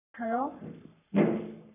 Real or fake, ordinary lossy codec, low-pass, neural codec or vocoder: fake; none; 3.6 kHz; codec, 44.1 kHz, 3.4 kbps, Pupu-Codec